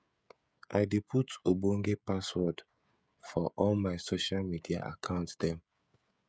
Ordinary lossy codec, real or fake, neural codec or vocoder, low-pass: none; fake; codec, 16 kHz, 16 kbps, FreqCodec, smaller model; none